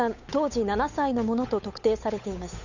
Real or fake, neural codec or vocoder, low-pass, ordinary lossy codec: fake; codec, 16 kHz, 8 kbps, FunCodec, trained on Chinese and English, 25 frames a second; 7.2 kHz; none